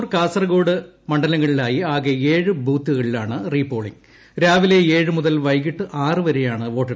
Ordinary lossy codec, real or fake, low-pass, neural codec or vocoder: none; real; none; none